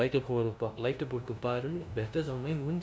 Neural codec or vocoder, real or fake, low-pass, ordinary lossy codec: codec, 16 kHz, 0.5 kbps, FunCodec, trained on LibriTTS, 25 frames a second; fake; none; none